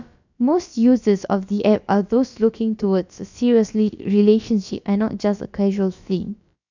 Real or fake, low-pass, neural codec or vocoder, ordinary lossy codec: fake; 7.2 kHz; codec, 16 kHz, about 1 kbps, DyCAST, with the encoder's durations; none